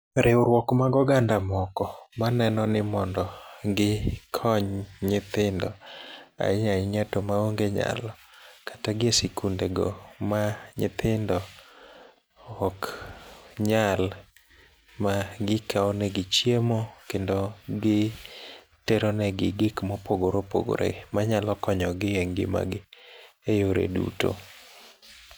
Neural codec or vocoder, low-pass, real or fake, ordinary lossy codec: none; none; real; none